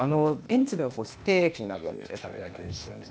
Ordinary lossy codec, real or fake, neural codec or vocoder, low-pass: none; fake; codec, 16 kHz, 0.8 kbps, ZipCodec; none